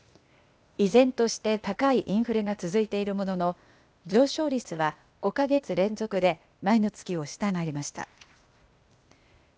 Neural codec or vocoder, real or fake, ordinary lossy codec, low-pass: codec, 16 kHz, 0.8 kbps, ZipCodec; fake; none; none